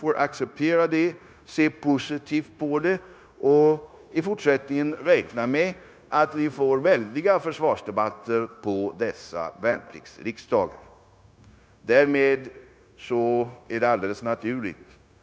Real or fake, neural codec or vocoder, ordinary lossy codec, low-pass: fake; codec, 16 kHz, 0.9 kbps, LongCat-Audio-Codec; none; none